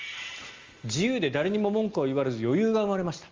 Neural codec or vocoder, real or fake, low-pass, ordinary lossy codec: none; real; 7.2 kHz; Opus, 32 kbps